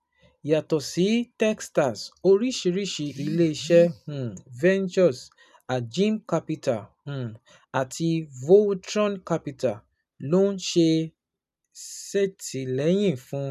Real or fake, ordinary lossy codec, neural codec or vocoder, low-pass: real; none; none; 14.4 kHz